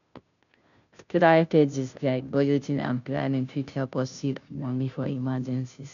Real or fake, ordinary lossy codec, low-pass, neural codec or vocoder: fake; none; 7.2 kHz; codec, 16 kHz, 0.5 kbps, FunCodec, trained on Chinese and English, 25 frames a second